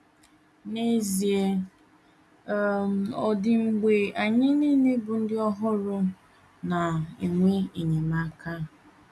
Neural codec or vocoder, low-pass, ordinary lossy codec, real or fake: none; none; none; real